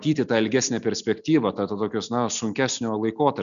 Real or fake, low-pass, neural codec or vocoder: real; 7.2 kHz; none